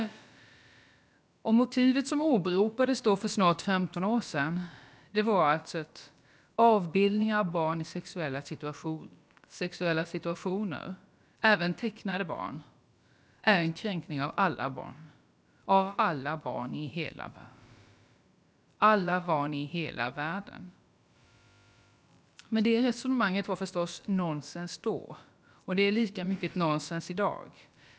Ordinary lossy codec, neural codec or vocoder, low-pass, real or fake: none; codec, 16 kHz, about 1 kbps, DyCAST, with the encoder's durations; none; fake